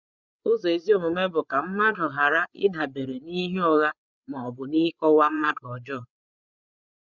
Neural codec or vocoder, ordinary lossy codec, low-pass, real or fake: codec, 16 kHz, 8 kbps, FreqCodec, larger model; none; 7.2 kHz; fake